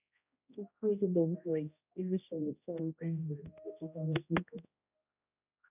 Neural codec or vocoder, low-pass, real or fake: codec, 16 kHz, 0.5 kbps, X-Codec, HuBERT features, trained on general audio; 3.6 kHz; fake